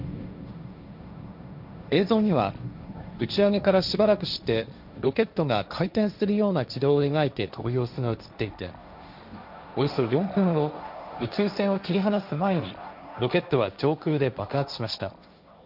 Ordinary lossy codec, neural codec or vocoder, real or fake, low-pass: none; codec, 16 kHz, 1.1 kbps, Voila-Tokenizer; fake; 5.4 kHz